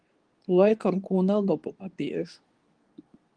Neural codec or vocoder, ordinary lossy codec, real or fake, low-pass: codec, 24 kHz, 0.9 kbps, WavTokenizer, medium speech release version 2; Opus, 24 kbps; fake; 9.9 kHz